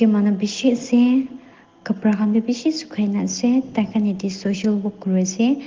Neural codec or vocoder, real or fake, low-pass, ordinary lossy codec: none; real; 7.2 kHz; Opus, 16 kbps